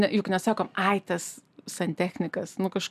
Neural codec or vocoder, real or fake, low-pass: none; real; 14.4 kHz